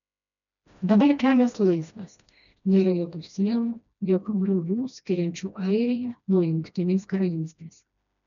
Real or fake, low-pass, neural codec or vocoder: fake; 7.2 kHz; codec, 16 kHz, 1 kbps, FreqCodec, smaller model